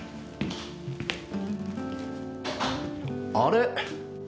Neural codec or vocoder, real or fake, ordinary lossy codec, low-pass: none; real; none; none